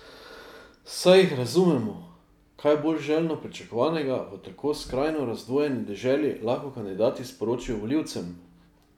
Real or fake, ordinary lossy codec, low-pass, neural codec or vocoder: real; none; 19.8 kHz; none